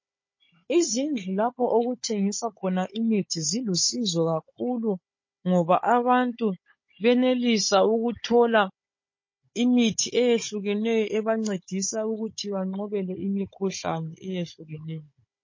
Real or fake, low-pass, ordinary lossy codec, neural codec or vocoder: fake; 7.2 kHz; MP3, 32 kbps; codec, 16 kHz, 16 kbps, FunCodec, trained on Chinese and English, 50 frames a second